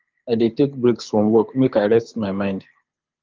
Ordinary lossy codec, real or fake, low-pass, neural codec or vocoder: Opus, 32 kbps; fake; 7.2 kHz; codec, 24 kHz, 6 kbps, HILCodec